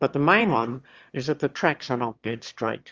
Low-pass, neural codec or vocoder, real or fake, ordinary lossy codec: 7.2 kHz; autoencoder, 22.05 kHz, a latent of 192 numbers a frame, VITS, trained on one speaker; fake; Opus, 32 kbps